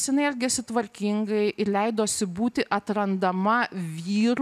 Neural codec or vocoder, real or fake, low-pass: none; real; 14.4 kHz